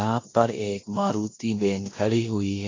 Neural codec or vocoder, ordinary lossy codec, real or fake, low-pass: codec, 16 kHz in and 24 kHz out, 0.9 kbps, LongCat-Audio-Codec, four codebook decoder; AAC, 32 kbps; fake; 7.2 kHz